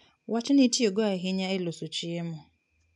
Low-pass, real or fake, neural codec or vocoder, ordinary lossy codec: 10.8 kHz; real; none; none